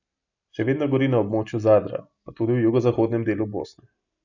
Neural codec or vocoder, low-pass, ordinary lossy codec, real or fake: none; 7.2 kHz; none; real